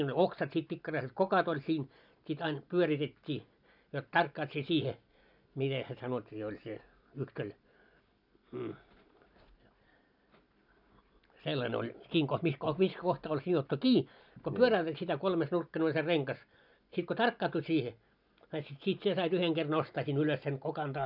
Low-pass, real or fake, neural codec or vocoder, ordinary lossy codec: 5.4 kHz; real; none; none